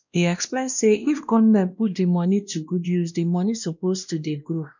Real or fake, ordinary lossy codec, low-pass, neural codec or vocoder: fake; none; 7.2 kHz; codec, 16 kHz, 1 kbps, X-Codec, WavLM features, trained on Multilingual LibriSpeech